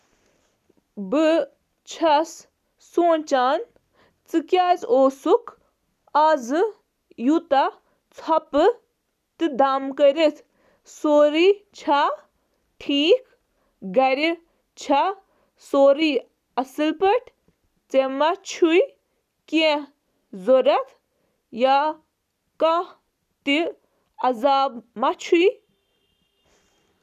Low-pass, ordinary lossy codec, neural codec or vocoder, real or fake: 14.4 kHz; none; none; real